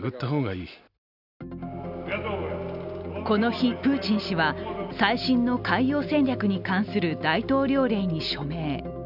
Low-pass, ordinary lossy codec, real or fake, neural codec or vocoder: 5.4 kHz; none; real; none